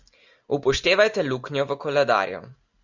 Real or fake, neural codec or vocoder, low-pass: real; none; 7.2 kHz